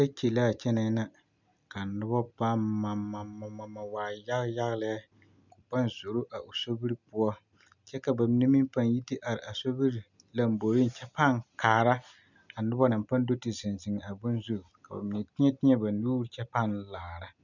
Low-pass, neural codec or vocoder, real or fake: 7.2 kHz; none; real